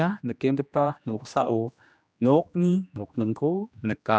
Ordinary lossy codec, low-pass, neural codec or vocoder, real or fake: none; none; codec, 16 kHz, 1 kbps, X-Codec, HuBERT features, trained on general audio; fake